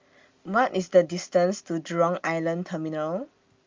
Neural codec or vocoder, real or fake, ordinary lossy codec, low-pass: none; real; Opus, 32 kbps; 7.2 kHz